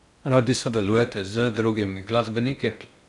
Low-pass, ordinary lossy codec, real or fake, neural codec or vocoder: 10.8 kHz; none; fake; codec, 16 kHz in and 24 kHz out, 0.6 kbps, FocalCodec, streaming, 4096 codes